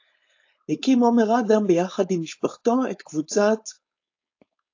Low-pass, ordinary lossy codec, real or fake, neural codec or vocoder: 7.2 kHz; AAC, 48 kbps; fake; codec, 16 kHz, 4.8 kbps, FACodec